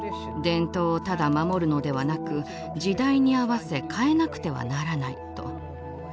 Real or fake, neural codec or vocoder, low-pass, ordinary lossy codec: real; none; none; none